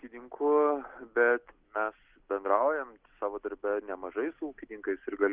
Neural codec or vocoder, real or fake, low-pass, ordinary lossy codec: none; real; 3.6 kHz; Opus, 16 kbps